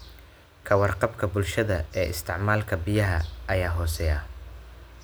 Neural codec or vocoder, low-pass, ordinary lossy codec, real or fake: none; none; none; real